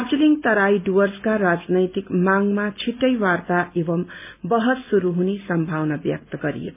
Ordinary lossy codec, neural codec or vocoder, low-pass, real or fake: MP3, 32 kbps; none; 3.6 kHz; real